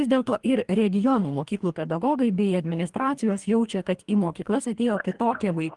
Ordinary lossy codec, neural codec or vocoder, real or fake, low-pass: Opus, 24 kbps; codec, 44.1 kHz, 2.6 kbps, DAC; fake; 10.8 kHz